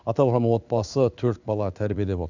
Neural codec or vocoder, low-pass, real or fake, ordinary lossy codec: codec, 16 kHz in and 24 kHz out, 1 kbps, XY-Tokenizer; 7.2 kHz; fake; none